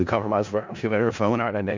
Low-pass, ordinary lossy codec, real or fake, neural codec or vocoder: 7.2 kHz; MP3, 64 kbps; fake; codec, 16 kHz in and 24 kHz out, 0.4 kbps, LongCat-Audio-Codec, four codebook decoder